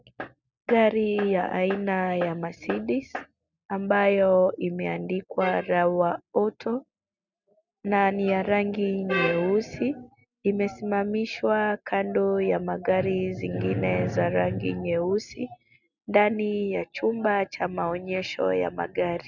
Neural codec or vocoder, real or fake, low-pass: none; real; 7.2 kHz